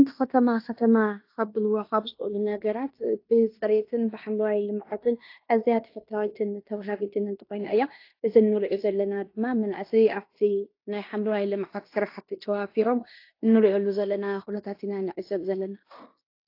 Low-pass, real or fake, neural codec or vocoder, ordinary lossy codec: 5.4 kHz; fake; codec, 16 kHz in and 24 kHz out, 0.9 kbps, LongCat-Audio-Codec, fine tuned four codebook decoder; AAC, 32 kbps